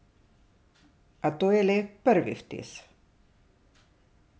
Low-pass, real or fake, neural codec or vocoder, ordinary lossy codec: none; real; none; none